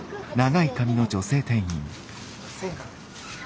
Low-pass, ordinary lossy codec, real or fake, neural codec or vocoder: none; none; real; none